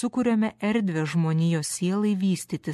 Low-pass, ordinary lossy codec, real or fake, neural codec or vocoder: 14.4 kHz; MP3, 64 kbps; real; none